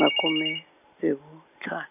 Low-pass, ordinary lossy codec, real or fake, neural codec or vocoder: 3.6 kHz; none; real; none